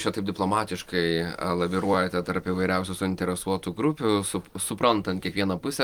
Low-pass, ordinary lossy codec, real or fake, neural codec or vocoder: 19.8 kHz; Opus, 32 kbps; fake; vocoder, 44.1 kHz, 128 mel bands every 256 samples, BigVGAN v2